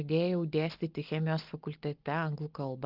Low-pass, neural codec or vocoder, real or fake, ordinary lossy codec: 5.4 kHz; none; real; Opus, 32 kbps